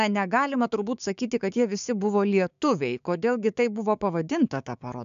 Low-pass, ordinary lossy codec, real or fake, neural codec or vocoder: 7.2 kHz; AAC, 96 kbps; fake; codec, 16 kHz, 6 kbps, DAC